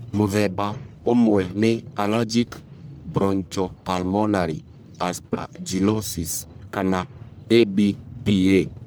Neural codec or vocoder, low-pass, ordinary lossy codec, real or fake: codec, 44.1 kHz, 1.7 kbps, Pupu-Codec; none; none; fake